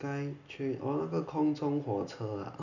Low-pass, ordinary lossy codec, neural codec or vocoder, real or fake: 7.2 kHz; none; none; real